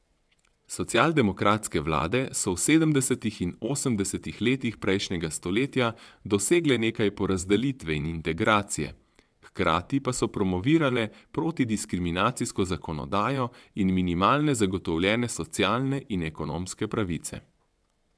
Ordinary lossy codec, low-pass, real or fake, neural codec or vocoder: none; none; fake; vocoder, 22.05 kHz, 80 mel bands, WaveNeXt